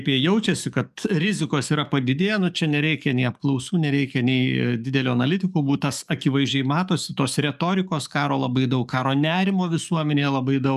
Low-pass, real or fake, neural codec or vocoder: 14.4 kHz; fake; codec, 44.1 kHz, 7.8 kbps, DAC